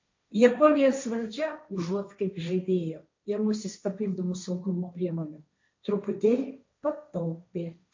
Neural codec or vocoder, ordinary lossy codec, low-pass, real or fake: codec, 16 kHz, 1.1 kbps, Voila-Tokenizer; MP3, 48 kbps; 7.2 kHz; fake